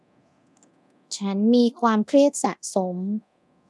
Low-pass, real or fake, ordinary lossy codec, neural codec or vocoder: none; fake; none; codec, 24 kHz, 0.9 kbps, DualCodec